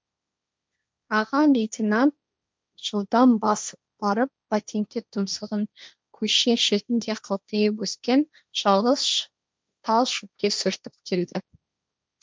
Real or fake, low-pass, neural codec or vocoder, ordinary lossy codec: fake; none; codec, 16 kHz, 1.1 kbps, Voila-Tokenizer; none